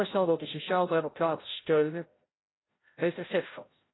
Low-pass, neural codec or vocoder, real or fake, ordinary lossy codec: 7.2 kHz; codec, 16 kHz, 0.5 kbps, FreqCodec, larger model; fake; AAC, 16 kbps